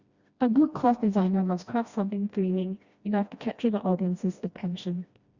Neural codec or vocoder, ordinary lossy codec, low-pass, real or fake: codec, 16 kHz, 1 kbps, FreqCodec, smaller model; Opus, 64 kbps; 7.2 kHz; fake